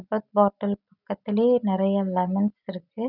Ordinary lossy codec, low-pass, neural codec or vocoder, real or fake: none; 5.4 kHz; none; real